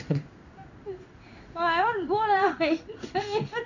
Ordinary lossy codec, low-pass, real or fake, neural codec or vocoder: none; 7.2 kHz; fake; codec, 16 kHz in and 24 kHz out, 1 kbps, XY-Tokenizer